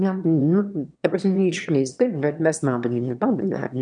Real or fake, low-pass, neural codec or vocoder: fake; 9.9 kHz; autoencoder, 22.05 kHz, a latent of 192 numbers a frame, VITS, trained on one speaker